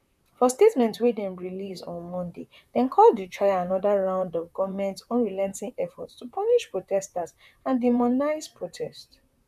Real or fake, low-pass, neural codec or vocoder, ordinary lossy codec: fake; 14.4 kHz; vocoder, 44.1 kHz, 128 mel bands, Pupu-Vocoder; none